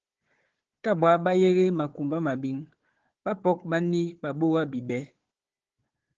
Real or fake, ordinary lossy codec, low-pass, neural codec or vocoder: fake; Opus, 16 kbps; 7.2 kHz; codec, 16 kHz, 4 kbps, FunCodec, trained on Chinese and English, 50 frames a second